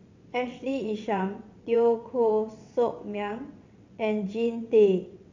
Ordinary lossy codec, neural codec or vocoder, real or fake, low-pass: AAC, 48 kbps; vocoder, 22.05 kHz, 80 mel bands, WaveNeXt; fake; 7.2 kHz